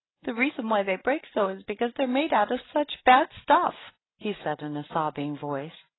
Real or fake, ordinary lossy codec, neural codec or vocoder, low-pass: real; AAC, 16 kbps; none; 7.2 kHz